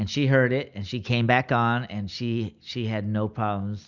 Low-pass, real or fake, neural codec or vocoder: 7.2 kHz; real; none